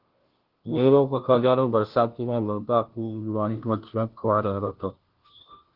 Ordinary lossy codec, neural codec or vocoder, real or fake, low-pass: Opus, 16 kbps; codec, 16 kHz, 0.5 kbps, FunCodec, trained on Chinese and English, 25 frames a second; fake; 5.4 kHz